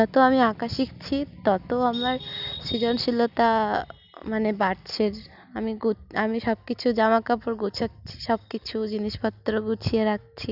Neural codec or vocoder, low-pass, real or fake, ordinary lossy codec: none; 5.4 kHz; real; none